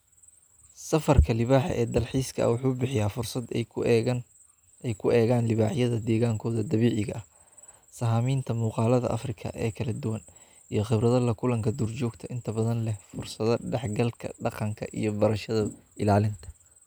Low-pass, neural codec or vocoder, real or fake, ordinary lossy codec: none; none; real; none